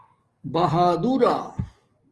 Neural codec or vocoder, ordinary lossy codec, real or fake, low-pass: codec, 44.1 kHz, 7.8 kbps, DAC; Opus, 24 kbps; fake; 10.8 kHz